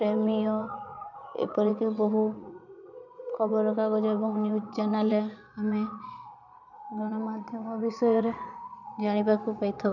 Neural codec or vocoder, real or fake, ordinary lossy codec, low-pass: vocoder, 22.05 kHz, 80 mel bands, Vocos; fake; none; 7.2 kHz